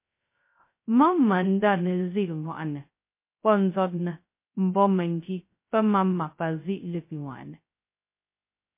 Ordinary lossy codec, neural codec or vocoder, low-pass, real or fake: MP3, 24 kbps; codec, 16 kHz, 0.2 kbps, FocalCodec; 3.6 kHz; fake